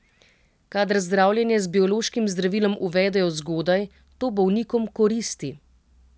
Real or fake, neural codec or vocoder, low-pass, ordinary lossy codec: real; none; none; none